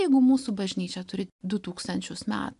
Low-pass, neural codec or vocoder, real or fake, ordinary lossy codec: 10.8 kHz; none; real; AAC, 96 kbps